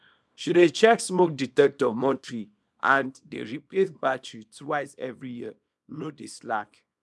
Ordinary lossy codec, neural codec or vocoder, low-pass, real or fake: none; codec, 24 kHz, 0.9 kbps, WavTokenizer, small release; none; fake